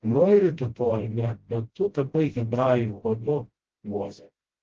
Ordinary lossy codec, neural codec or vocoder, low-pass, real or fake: Opus, 16 kbps; codec, 16 kHz, 0.5 kbps, FreqCodec, smaller model; 7.2 kHz; fake